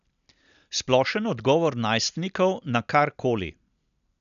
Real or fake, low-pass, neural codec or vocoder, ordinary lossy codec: real; 7.2 kHz; none; none